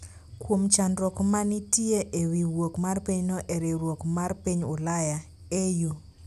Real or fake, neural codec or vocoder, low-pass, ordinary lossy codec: real; none; 14.4 kHz; none